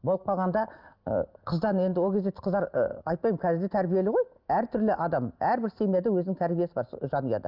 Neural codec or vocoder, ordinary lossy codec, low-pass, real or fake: none; Opus, 24 kbps; 5.4 kHz; real